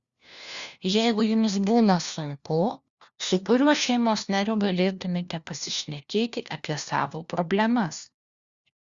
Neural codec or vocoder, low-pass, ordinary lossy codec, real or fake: codec, 16 kHz, 1 kbps, FunCodec, trained on LibriTTS, 50 frames a second; 7.2 kHz; Opus, 64 kbps; fake